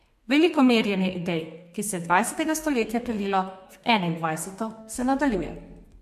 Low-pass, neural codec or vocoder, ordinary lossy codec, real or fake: 14.4 kHz; codec, 32 kHz, 1.9 kbps, SNAC; MP3, 64 kbps; fake